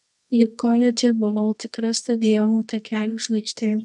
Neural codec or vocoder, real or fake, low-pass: codec, 24 kHz, 0.9 kbps, WavTokenizer, medium music audio release; fake; 10.8 kHz